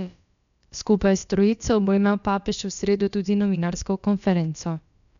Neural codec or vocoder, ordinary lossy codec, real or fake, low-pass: codec, 16 kHz, about 1 kbps, DyCAST, with the encoder's durations; none; fake; 7.2 kHz